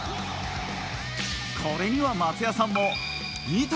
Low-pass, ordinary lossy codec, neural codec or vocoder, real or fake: none; none; none; real